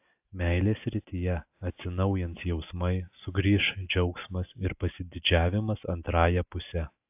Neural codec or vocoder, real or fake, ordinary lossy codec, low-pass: none; real; AAC, 32 kbps; 3.6 kHz